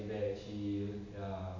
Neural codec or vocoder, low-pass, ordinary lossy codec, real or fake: none; 7.2 kHz; AAC, 48 kbps; real